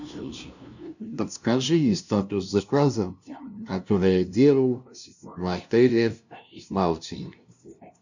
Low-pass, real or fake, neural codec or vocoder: 7.2 kHz; fake; codec, 16 kHz, 0.5 kbps, FunCodec, trained on LibriTTS, 25 frames a second